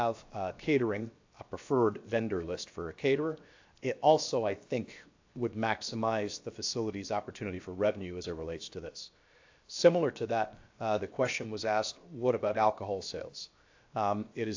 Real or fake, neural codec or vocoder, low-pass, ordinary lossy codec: fake; codec, 16 kHz, 0.7 kbps, FocalCodec; 7.2 kHz; AAC, 48 kbps